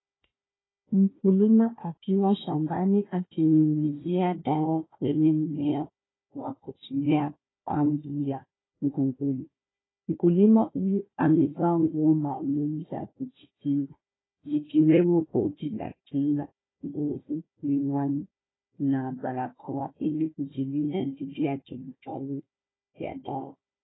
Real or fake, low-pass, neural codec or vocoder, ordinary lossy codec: fake; 7.2 kHz; codec, 16 kHz, 1 kbps, FunCodec, trained on Chinese and English, 50 frames a second; AAC, 16 kbps